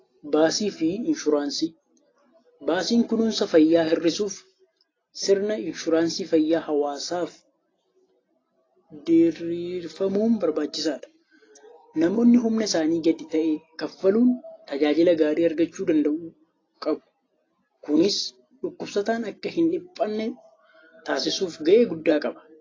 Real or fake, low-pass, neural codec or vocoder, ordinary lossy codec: real; 7.2 kHz; none; AAC, 32 kbps